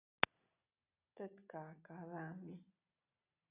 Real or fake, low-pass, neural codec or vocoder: real; 3.6 kHz; none